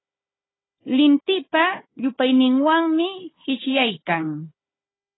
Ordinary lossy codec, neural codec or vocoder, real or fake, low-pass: AAC, 16 kbps; codec, 16 kHz, 4 kbps, FunCodec, trained on Chinese and English, 50 frames a second; fake; 7.2 kHz